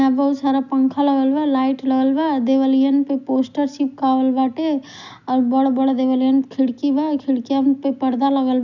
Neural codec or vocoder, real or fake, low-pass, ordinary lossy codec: none; real; 7.2 kHz; none